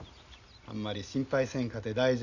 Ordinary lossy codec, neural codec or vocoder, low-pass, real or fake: Opus, 64 kbps; none; 7.2 kHz; real